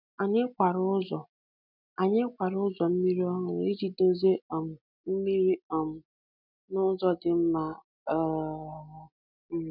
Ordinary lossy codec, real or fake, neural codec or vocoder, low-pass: none; real; none; 5.4 kHz